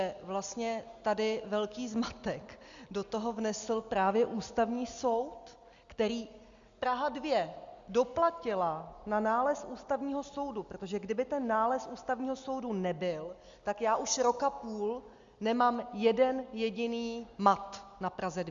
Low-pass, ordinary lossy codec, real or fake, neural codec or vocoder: 7.2 kHz; Opus, 64 kbps; real; none